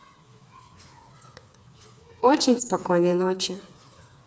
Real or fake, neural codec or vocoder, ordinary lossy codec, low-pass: fake; codec, 16 kHz, 4 kbps, FreqCodec, smaller model; none; none